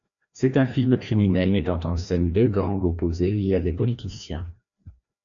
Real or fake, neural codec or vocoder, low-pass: fake; codec, 16 kHz, 1 kbps, FreqCodec, larger model; 7.2 kHz